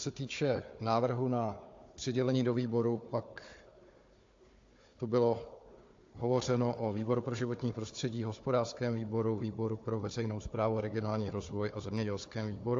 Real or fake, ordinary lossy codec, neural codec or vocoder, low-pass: fake; AAC, 48 kbps; codec, 16 kHz, 4 kbps, FunCodec, trained on Chinese and English, 50 frames a second; 7.2 kHz